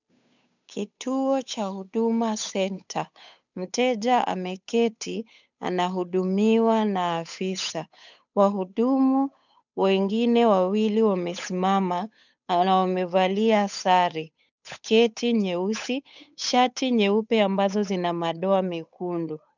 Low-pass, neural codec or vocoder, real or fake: 7.2 kHz; codec, 16 kHz, 8 kbps, FunCodec, trained on Chinese and English, 25 frames a second; fake